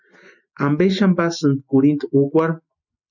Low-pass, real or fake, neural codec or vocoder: 7.2 kHz; real; none